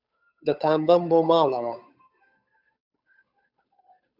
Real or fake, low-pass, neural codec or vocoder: fake; 5.4 kHz; codec, 16 kHz, 8 kbps, FunCodec, trained on Chinese and English, 25 frames a second